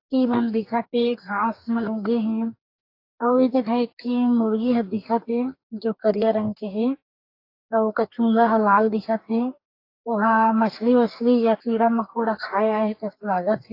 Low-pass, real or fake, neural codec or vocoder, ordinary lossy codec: 5.4 kHz; fake; codec, 44.1 kHz, 2.6 kbps, DAC; AAC, 32 kbps